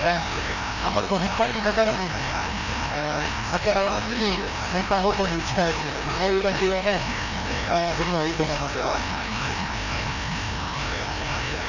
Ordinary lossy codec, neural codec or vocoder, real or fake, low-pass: none; codec, 16 kHz, 1 kbps, FreqCodec, larger model; fake; 7.2 kHz